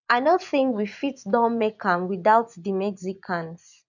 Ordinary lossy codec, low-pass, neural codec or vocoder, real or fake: none; 7.2 kHz; vocoder, 24 kHz, 100 mel bands, Vocos; fake